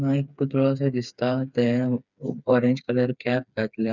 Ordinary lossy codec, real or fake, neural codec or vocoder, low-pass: none; fake; codec, 16 kHz, 4 kbps, FreqCodec, smaller model; 7.2 kHz